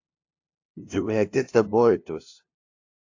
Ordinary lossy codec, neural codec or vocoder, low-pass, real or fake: AAC, 48 kbps; codec, 16 kHz, 0.5 kbps, FunCodec, trained on LibriTTS, 25 frames a second; 7.2 kHz; fake